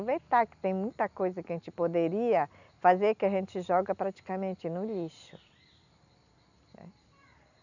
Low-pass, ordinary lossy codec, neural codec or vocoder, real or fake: 7.2 kHz; none; none; real